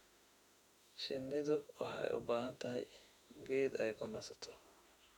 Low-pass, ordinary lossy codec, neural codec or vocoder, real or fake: 19.8 kHz; none; autoencoder, 48 kHz, 32 numbers a frame, DAC-VAE, trained on Japanese speech; fake